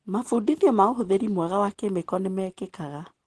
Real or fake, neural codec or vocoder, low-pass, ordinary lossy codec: real; none; 10.8 kHz; Opus, 16 kbps